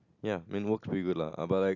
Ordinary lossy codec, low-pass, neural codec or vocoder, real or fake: none; 7.2 kHz; none; real